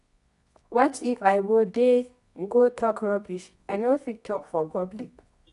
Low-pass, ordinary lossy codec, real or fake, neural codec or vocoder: 10.8 kHz; none; fake; codec, 24 kHz, 0.9 kbps, WavTokenizer, medium music audio release